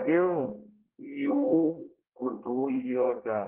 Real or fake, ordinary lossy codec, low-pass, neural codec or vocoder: fake; Opus, 16 kbps; 3.6 kHz; codec, 24 kHz, 1 kbps, SNAC